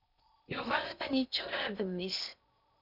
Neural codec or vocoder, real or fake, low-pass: codec, 16 kHz in and 24 kHz out, 0.6 kbps, FocalCodec, streaming, 4096 codes; fake; 5.4 kHz